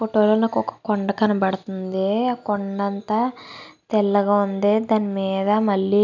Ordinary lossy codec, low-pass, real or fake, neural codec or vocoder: none; 7.2 kHz; real; none